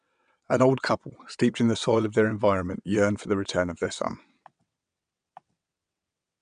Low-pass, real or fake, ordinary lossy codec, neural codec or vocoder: 9.9 kHz; fake; none; vocoder, 22.05 kHz, 80 mel bands, WaveNeXt